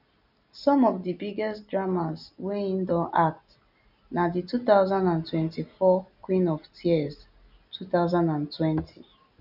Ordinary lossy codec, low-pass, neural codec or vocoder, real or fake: none; 5.4 kHz; none; real